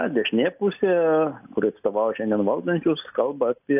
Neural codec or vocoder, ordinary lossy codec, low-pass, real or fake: none; AAC, 32 kbps; 3.6 kHz; real